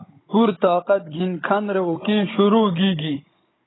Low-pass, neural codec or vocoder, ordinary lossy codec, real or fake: 7.2 kHz; codec, 16 kHz, 16 kbps, FunCodec, trained on Chinese and English, 50 frames a second; AAC, 16 kbps; fake